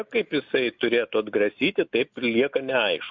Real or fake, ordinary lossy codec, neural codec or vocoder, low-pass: real; MP3, 48 kbps; none; 7.2 kHz